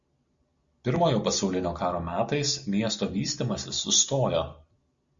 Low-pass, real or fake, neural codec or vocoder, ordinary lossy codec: 7.2 kHz; real; none; Opus, 64 kbps